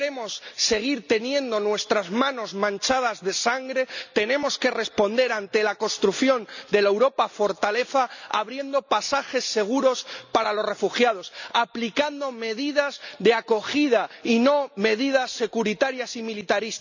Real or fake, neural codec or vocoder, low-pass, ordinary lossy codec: real; none; 7.2 kHz; none